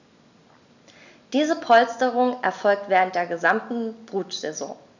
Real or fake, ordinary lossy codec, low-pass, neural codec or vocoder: real; none; 7.2 kHz; none